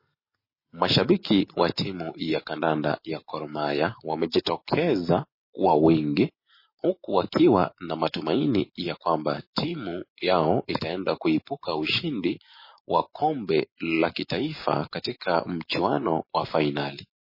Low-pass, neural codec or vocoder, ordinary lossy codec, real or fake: 5.4 kHz; none; MP3, 24 kbps; real